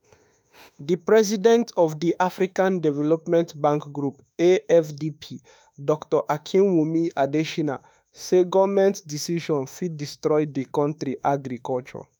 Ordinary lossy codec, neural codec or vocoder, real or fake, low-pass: none; autoencoder, 48 kHz, 32 numbers a frame, DAC-VAE, trained on Japanese speech; fake; none